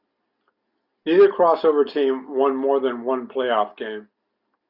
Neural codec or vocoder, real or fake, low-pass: none; real; 5.4 kHz